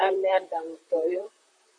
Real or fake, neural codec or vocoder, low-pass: fake; vocoder, 44.1 kHz, 128 mel bands, Pupu-Vocoder; 9.9 kHz